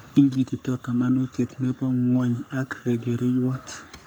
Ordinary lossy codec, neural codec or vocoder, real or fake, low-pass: none; codec, 44.1 kHz, 3.4 kbps, Pupu-Codec; fake; none